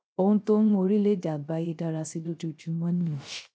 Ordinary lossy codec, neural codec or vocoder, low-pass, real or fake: none; codec, 16 kHz, 0.3 kbps, FocalCodec; none; fake